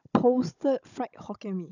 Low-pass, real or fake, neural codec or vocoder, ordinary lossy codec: 7.2 kHz; fake; codec, 16 kHz, 16 kbps, FunCodec, trained on Chinese and English, 50 frames a second; none